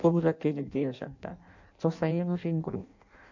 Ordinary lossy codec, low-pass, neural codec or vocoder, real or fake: none; 7.2 kHz; codec, 16 kHz in and 24 kHz out, 0.6 kbps, FireRedTTS-2 codec; fake